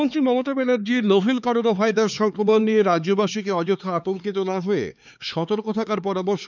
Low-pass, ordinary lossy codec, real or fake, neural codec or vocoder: 7.2 kHz; none; fake; codec, 16 kHz, 4 kbps, X-Codec, HuBERT features, trained on LibriSpeech